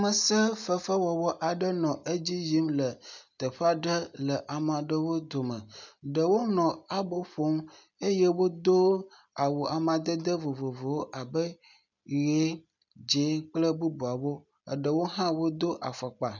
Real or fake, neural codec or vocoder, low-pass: real; none; 7.2 kHz